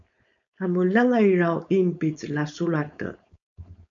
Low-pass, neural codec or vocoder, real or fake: 7.2 kHz; codec, 16 kHz, 4.8 kbps, FACodec; fake